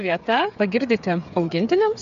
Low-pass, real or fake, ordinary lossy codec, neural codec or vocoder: 7.2 kHz; fake; AAC, 96 kbps; codec, 16 kHz, 8 kbps, FreqCodec, smaller model